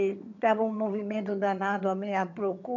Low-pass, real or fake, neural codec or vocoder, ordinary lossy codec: 7.2 kHz; fake; vocoder, 22.05 kHz, 80 mel bands, HiFi-GAN; none